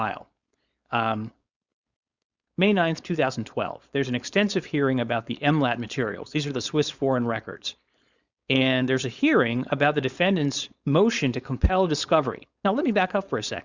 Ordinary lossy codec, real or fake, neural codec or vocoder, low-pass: Opus, 64 kbps; fake; codec, 16 kHz, 4.8 kbps, FACodec; 7.2 kHz